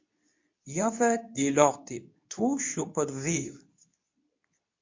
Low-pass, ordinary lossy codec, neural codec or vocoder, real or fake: 7.2 kHz; MP3, 48 kbps; codec, 24 kHz, 0.9 kbps, WavTokenizer, medium speech release version 2; fake